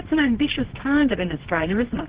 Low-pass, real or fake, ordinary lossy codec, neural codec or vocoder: 3.6 kHz; fake; Opus, 16 kbps; codec, 24 kHz, 0.9 kbps, WavTokenizer, medium music audio release